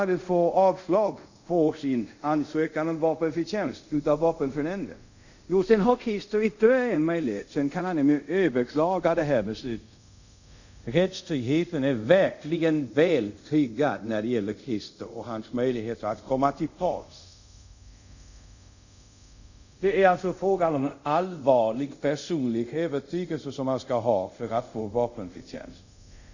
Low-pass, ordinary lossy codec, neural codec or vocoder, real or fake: 7.2 kHz; none; codec, 24 kHz, 0.5 kbps, DualCodec; fake